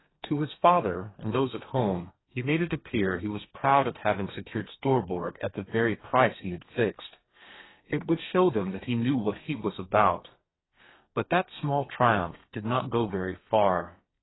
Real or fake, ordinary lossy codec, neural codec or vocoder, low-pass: fake; AAC, 16 kbps; codec, 32 kHz, 1.9 kbps, SNAC; 7.2 kHz